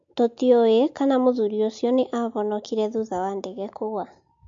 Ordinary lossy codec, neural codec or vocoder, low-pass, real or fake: MP3, 48 kbps; none; 7.2 kHz; real